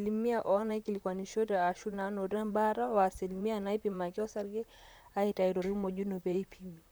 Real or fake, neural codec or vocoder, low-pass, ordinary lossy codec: fake; vocoder, 44.1 kHz, 128 mel bands, Pupu-Vocoder; none; none